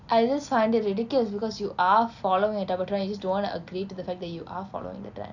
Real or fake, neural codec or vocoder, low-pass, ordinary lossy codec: real; none; 7.2 kHz; none